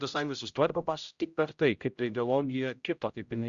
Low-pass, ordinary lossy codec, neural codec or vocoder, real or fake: 7.2 kHz; Opus, 64 kbps; codec, 16 kHz, 0.5 kbps, X-Codec, HuBERT features, trained on general audio; fake